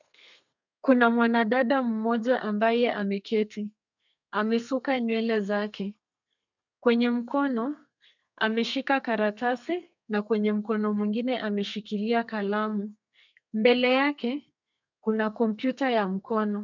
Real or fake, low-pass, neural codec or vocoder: fake; 7.2 kHz; codec, 32 kHz, 1.9 kbps, SNAC